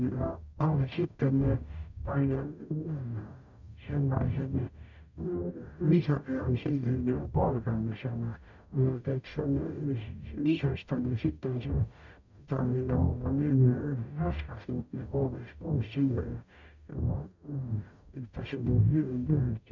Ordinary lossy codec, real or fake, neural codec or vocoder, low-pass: none; fake; codec, 44.1 kHz, 0.9 kbps, DAC; 7.2 kHz